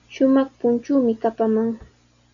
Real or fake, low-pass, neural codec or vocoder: real; 7.2 kHz; none